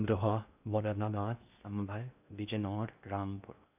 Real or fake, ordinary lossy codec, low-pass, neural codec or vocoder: fake; none; 3.6 kHz; codec, 16 kHz in and 24 kHz out, 0.6 kbps, FocalCodec, streaming, 2048 codes